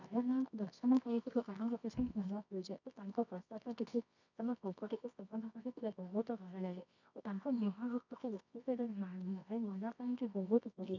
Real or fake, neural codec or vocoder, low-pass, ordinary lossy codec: fake; codec, 24 kHz, 0.9 kbps, WavTokenizer, medium music audio release; 7.2 kHz; none